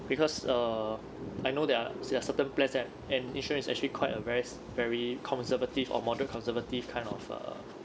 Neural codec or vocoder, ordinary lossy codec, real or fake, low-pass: none; none; real; none